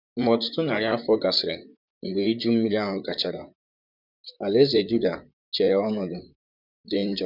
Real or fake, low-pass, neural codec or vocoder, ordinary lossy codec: fake; 5.4 kHz; vocoder, 44.1 kHz, 128 mel bands, Pupu-Vocoder; none